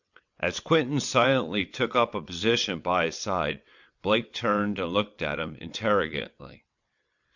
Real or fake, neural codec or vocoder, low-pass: fake; vocoder, 22.05 kHz, 80 mel bands, WaveNeXt; 7.2 kHz